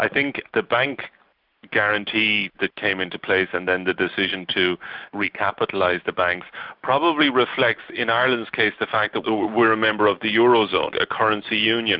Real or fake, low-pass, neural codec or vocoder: real; 5.4 kHz; none